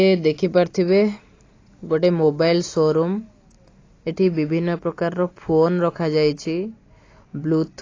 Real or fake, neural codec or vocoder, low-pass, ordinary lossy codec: real; none; 7.2 kHz; AAC, 32 kbps